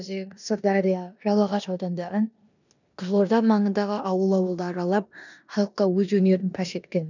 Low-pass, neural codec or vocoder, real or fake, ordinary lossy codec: 7.2 kHz; codec, 16 kHz in and 24 kHz out, 0.9 kbps, LongCat-Audio-Codec, four codebook decoder; fake; none